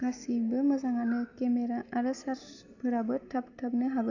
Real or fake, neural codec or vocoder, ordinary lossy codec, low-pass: real; none; none; 7.2 kHz